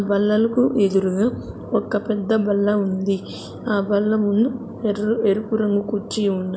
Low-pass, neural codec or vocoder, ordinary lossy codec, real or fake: none; none; none; real